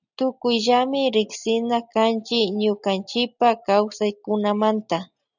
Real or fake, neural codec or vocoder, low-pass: real; none; 7.2 kHz